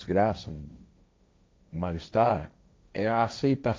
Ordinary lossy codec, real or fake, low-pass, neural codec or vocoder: none; fake; none; codec, 16 kHz, 1.1 kbps, Voila-Tokenizer